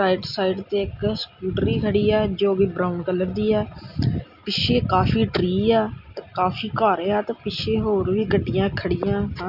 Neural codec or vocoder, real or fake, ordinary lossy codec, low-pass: none; real; none; 5.4 kHz